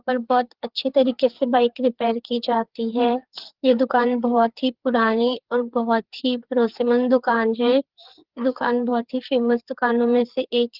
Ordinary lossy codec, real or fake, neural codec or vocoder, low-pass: Opus, 16 kbps; fake; codec, 16 kHz, 4 kbps, FreqCodec, larger model; 5.4 kHz